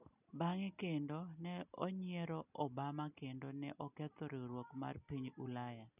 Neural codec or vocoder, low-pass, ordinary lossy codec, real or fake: none; 3.6 kHz; none; real